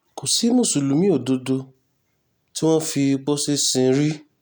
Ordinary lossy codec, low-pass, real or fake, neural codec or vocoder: none; none; real; none